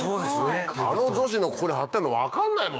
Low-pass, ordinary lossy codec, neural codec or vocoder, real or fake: none; none; codec, 16 kHz, 6 kbps, DAC; fake